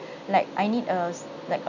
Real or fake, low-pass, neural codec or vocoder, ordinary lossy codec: real; 7.2 kHz; none; none